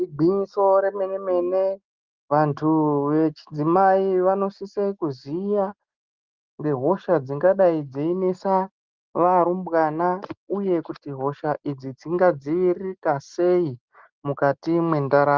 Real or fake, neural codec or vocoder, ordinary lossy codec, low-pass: real; none; Opus, 32 kbps; 7.2 kHz